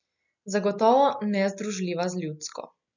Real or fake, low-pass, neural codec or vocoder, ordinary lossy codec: real; 7.2 kHz; none; none